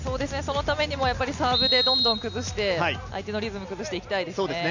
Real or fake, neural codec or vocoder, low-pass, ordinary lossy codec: real; none; 7.2 kHz; none